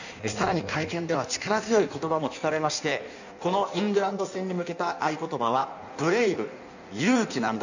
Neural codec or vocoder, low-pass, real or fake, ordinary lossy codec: codec, 16 kHz in and 24 kHz out, 1.1 kbps, FireRedTTS-2 codec; 7.2 kHz; fake; none